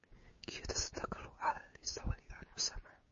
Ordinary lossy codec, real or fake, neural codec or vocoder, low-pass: MP3, 32 kbps; fake; codec, 16 kHz, 6 kbps, DAC; 7.2 kHz